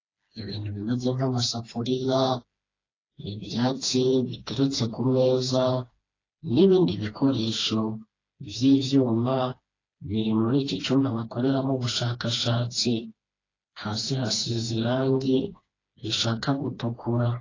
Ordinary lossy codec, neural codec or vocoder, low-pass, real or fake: AAC, 32 kbps; codec, 16 kHz, 2 kbps, FreqCodec, smaller model; 7.2 kHz; fake